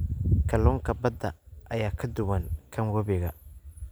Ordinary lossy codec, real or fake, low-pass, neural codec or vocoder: none; real; none; none